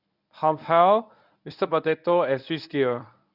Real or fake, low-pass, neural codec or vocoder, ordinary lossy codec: fake; 5.4 kHz; codec, 24 kHz, 0.9 kbps, WavTokenizer, medium speech release version 1; none